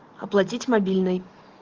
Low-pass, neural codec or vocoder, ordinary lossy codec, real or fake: 7.2 kHz; none; Opus, 16 kbps; real